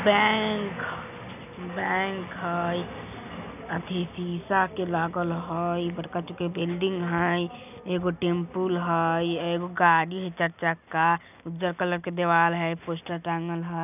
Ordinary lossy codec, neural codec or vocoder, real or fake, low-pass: none; none; real; 3.6 kHz